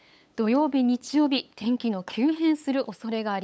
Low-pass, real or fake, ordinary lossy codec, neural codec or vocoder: none; fake; none; codec, 16 kHz, 8 kbps, FunCodec, trained on LibriTTS, 25 frames a second